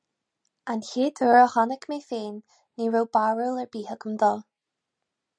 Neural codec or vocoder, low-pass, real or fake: none; 9.9 kHz; real